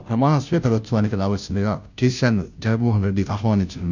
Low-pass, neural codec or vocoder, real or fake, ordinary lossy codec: 7.2 kHz; codec, 16 kHz, 0.5 kbps, FunCodec, trained on Chinese and English, 25 frames a second; fake; none